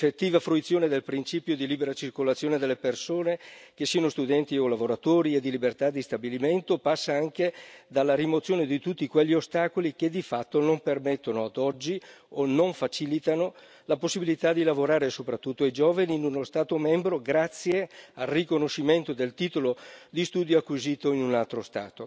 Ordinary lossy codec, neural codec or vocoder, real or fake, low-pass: none; none; real; none